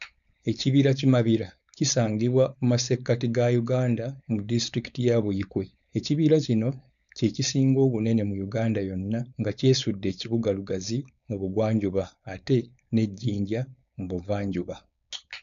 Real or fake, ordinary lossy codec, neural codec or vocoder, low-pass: fake; none; codec, 16 kHz, 4.8 kbps, FACodec; 7.2 kHz